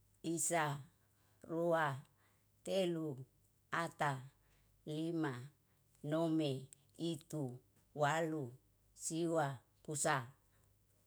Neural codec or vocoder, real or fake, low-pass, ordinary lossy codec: autoencoder, 48 kHz, 128 numbers a frame, DAC-VAE, trained on Japanese speech; fake; none; none